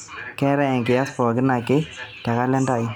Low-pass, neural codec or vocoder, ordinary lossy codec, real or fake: 19.8 kHz; none; none; real